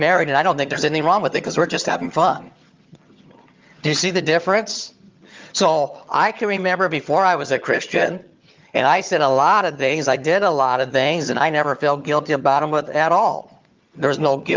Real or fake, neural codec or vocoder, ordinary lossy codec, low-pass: fake; vocoder, 22.05 kHz, 80 mel bands, HiFi-GAN; Opus, 32 kbps; 7.2 kHz